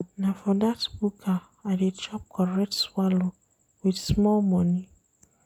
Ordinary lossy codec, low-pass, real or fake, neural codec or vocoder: none; 19.8 kHz; real; none